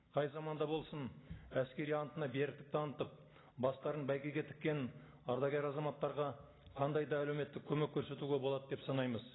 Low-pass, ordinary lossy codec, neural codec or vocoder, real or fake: 7.2 kHz; AAC, 16 kbps; none; real